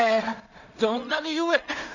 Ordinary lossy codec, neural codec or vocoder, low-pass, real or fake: none; codec, 16 kHz in and 24 kHz out, 0.4 kbps, LongCat-Audio-Codec, two codebook decoder; 7.2 kHz; fake